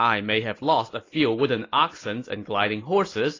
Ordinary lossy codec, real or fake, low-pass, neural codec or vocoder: AAC, 32 kbps; real; 7.2 kHz; none